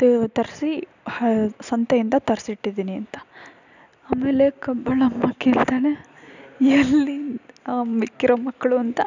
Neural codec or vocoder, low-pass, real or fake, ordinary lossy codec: none; 7.2 kHz; real; none